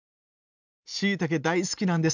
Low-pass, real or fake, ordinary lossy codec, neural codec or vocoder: 7.2 kHz; real; none; none